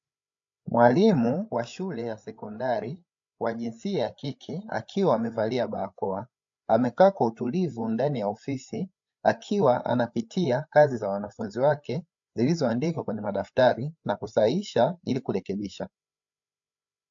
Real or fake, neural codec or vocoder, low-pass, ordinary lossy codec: fake; codec, 16 kHz, 8 kbps, FreqCodec, larger model; 7.2 kHz; AAC, 64 kbps